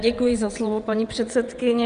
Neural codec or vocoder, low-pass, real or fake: vocoder, 22.05 kHz, 80 mel bands, WaveNeXt; 9.9 kHz; fake